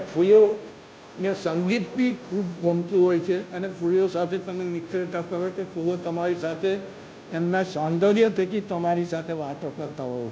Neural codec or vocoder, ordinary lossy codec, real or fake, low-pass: codec, 16 kHz, 0.5 kbps, FunCodec, trained on Chinese and English, 25 frames a second; none; fake; none